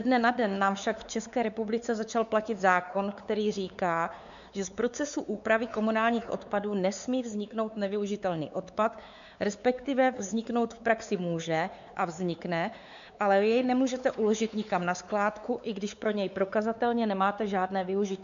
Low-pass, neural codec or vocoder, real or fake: 7.2 kHz; codec, 16 kHz, 4 kbps, X-Codec, WavLM features, trained on Multilingual LibriSpeech; fake